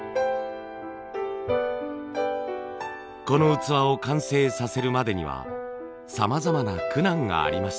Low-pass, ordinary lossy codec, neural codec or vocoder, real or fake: none; none; none; real